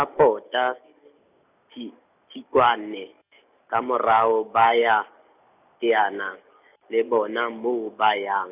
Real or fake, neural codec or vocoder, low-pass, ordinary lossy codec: real; none; 3.6 kHz; none